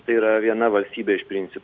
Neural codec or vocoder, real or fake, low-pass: none; real; 7.2 kHz